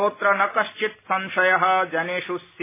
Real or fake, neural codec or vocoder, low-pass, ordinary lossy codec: real; none; 3.6 kHz; MP3, 16 kbps